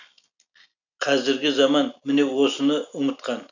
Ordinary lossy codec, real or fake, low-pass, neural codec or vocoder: none; real; 7.2 kHz; none